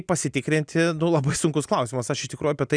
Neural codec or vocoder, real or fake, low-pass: none; real; 9.9 kHz